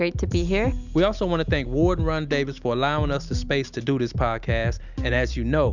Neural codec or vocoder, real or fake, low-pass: none; real; 7.2 kHz